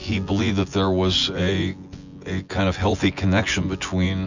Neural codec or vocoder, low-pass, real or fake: vocoder, 24 kHz, 100 mel bands, Vocos; 7.2 kHz; fake